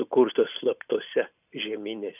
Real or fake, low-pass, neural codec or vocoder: real; 3.6 kHz; none